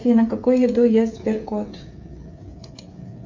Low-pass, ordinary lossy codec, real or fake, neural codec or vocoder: 7.2 kHz; MP3, 48 kbps; fake; codec, 16 kHz, 8 kbps, FreqCodec, smaller model